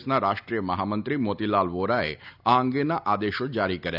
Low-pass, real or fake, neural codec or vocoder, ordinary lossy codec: 5.4 kHz; real; none; none